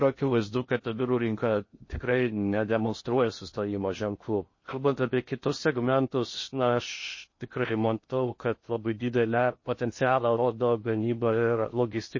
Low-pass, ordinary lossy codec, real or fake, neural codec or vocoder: 7.2 kHz; MP3, 32 kbps; fake; codec, 16 kHz in and 24 kHz out, 0.6 kbps, FocalCodec, streaming, 4096 codes